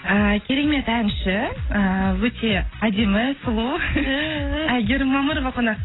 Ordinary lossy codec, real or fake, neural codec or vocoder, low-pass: AAC, 16 kbps; real; none; 7.2 kHz